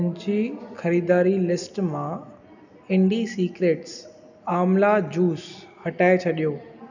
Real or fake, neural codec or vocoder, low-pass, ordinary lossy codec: real; none; 7.2 kHz; none